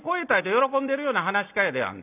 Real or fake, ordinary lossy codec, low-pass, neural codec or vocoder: real; none; 3.6 kHz; none